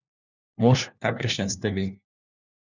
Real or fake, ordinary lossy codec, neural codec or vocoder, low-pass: fake; none; codec, 16 kHz, 1 kbps, FunCodec, trained on LibriTTS, 50 frames a second; 7.2 kHz